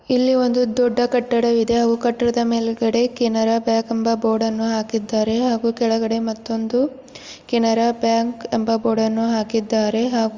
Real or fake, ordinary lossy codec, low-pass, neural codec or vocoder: real; Opus, 32 kbps; 7.2 kHz; none